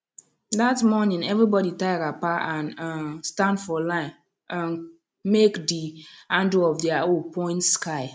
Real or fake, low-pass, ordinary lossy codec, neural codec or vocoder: real; none; none; none